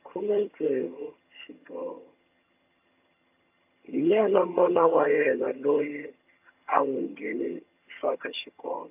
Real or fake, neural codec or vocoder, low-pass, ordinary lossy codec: fake; vocoder, 22.05 kHz, 80 mel bands, HiFi-GAN; 3.6 kHz; AAC, 32 kbps